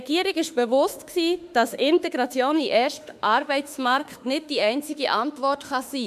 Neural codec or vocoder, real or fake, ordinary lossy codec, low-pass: autoencoder, 48 kHz, 32 numbers a frame, DAC-VAE, trained on Japanese speech; fake; none; 14.4 kHz